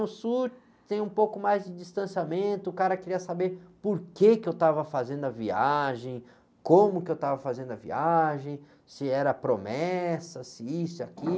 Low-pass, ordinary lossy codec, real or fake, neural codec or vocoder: none; none; real; none